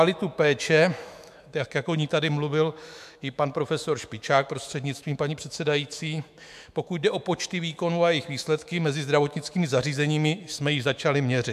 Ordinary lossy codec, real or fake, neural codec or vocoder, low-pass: MP3, 96 kbps; fake; autoencoder, 48 kHz, 128 numbers a frame, DAC-VAE, trained on Japanese speech; 14.4 kHz